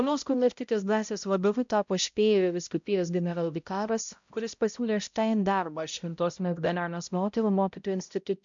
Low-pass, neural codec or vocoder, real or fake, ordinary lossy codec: 7.2 kHz; codec, 16 kHz, 0.5 kbps, X-Codec, HuBERT features, trained on balanced general audio; fake; MP3, 64 kbps